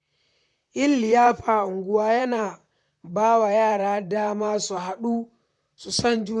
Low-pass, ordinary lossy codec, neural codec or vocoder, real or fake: 10.8 kHz; none; vocoder, 44.1 kHz, 128 mel bands, Pupu-Vocoder; fake